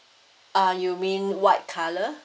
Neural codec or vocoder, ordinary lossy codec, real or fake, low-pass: none; none; real; none